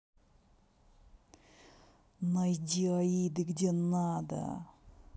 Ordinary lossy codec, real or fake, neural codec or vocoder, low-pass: none; real; none; none